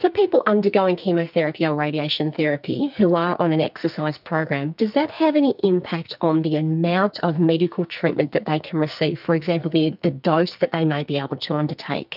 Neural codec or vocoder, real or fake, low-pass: codec, 44.1 kHz, 2.6 kbps, SNAC; fake; 5.4 kHz